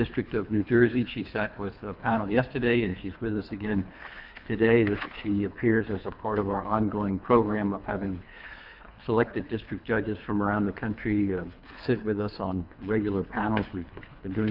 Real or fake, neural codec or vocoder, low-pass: fake; codec, 24 kHz, 3 kbps, HILCodec; 5.4 kHz